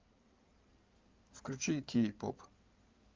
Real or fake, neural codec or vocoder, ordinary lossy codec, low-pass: fake; codec, 16 kHz in and 24 kHz out, 2.2 kbps, FireRedTTS-2 codec; Opus, 24 kbps; 7.2 kHz